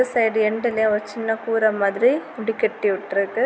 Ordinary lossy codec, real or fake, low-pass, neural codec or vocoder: none; real; none; none